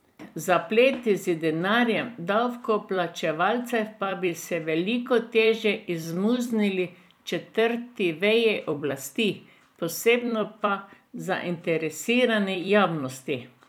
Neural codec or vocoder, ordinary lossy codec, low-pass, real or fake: vocoder, 44.1 kHz, 128 mel bands every 256 samples, BigVGAN v2; none; 19.8 kHz; fake